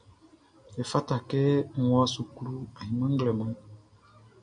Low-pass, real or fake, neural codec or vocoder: 9.9 kHz; real; none